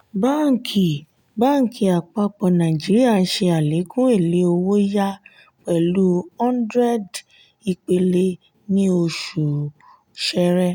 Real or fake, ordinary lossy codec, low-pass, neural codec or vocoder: real; none; 19.8 kHz; none